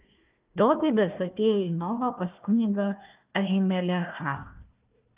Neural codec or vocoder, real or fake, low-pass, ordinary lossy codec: codec, 16 kHz, 1 kbps, FunCodec, trained on Chinese and English, 50 frames a second; fake; 3.6 kHz; Opus, 24 kbps